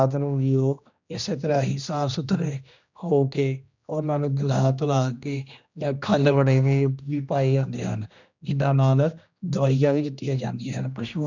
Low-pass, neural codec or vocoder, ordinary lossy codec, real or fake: 7.2 kHz; codec, 16 kHz, 1 kbps, X-Codec, HuBERT features, trained on general audio; none; fake